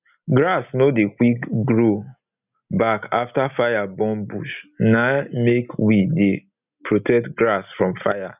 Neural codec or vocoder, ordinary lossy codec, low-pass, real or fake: none; none; 3.6 kHz; real